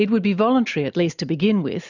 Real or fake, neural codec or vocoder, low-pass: real; none; 7.2 kHz